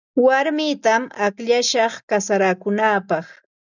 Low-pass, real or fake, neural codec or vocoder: 7.2 kHz; real; none